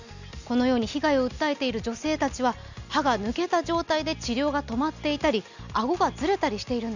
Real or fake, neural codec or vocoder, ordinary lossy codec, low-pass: real; none; none; 7.2 kHz